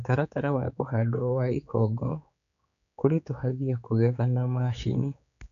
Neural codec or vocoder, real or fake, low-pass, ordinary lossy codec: codec, 16 kHz, 4 kbps, X-Codec, HuBERT features, trained on general audio; fake; 7.2 kHz; none